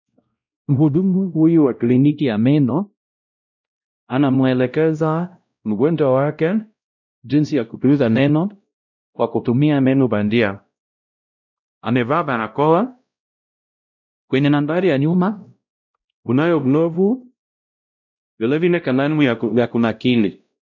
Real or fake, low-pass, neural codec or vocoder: fake; 7.2 kHz; codec, 16 kHz, 0.5 kbps, X-Codec, WavLM features, trained on Multilingual LibriSpeech